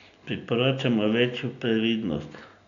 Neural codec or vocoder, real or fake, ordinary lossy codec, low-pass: none; real; none; 7.2 kHz